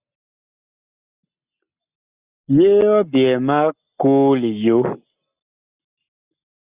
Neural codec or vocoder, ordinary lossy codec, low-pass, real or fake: none; Opus, 64 kbps; 3.6 kHz; real